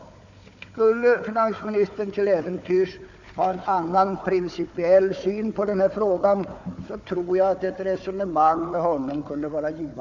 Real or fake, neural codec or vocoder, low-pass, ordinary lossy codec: fake; codec, 16 kHz, 4 kbps, FunCodec, trained on Chinese and English, 50 frames a second; 7.2 kHz; none